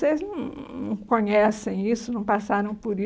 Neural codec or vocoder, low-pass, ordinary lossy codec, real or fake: none; none; none; real